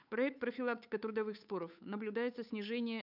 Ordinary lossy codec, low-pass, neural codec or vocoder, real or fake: none; 5.4 kHz; codec, 16 kHz, 8 kbps, FunCodec, trained on Chinese and English, 25 frames a second; fake